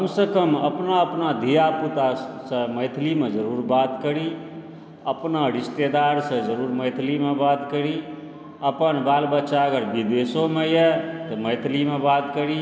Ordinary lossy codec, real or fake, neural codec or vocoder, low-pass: none; real; none; none